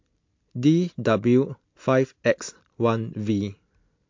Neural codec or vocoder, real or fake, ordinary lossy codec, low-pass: none; real; MP3, 48 kbps; 7.2 kHz